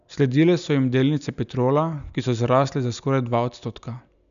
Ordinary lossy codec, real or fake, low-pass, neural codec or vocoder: none; real; 7.2 kHz; none